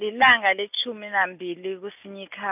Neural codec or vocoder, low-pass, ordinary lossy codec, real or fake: none; 3.6 kHz; none; real